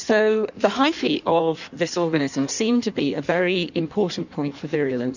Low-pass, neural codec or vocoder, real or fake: 7.2 kHz; codec, 16 kHz in and 24 kHz out, 1.1 kbps, FireRedTTS-2 codec; fake